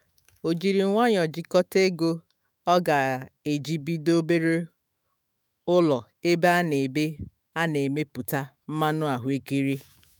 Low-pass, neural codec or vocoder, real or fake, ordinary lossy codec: none; autoencoder, 48 kHz, 128 numbers a frame, DAC-VAE, trained on Japanese speech; fake; none